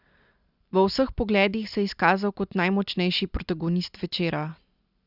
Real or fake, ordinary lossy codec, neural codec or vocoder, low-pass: real; Opus, 64 kbps; none; 5.4 kHz